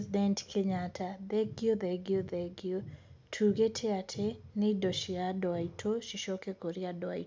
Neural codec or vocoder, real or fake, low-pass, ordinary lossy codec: none; real; none; none